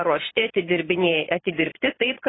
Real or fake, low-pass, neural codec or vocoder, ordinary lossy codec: fake; 7.2 kHz; vocoder, 22.05 kHz, 80 mel bands, Vocos; AAC, 16 kbps